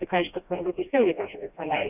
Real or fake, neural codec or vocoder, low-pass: fake; codec, 16 kHz, 1 kbps, FreqCodec, smaller model; 3.6 kHz